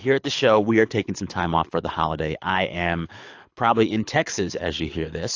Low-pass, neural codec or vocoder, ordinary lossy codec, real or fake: 7.2 kHz; codec, 24 kHz, 6 kbps, HILCodec; AAC, 48 kbps; fake